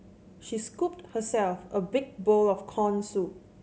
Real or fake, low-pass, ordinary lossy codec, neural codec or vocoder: real; none; none; none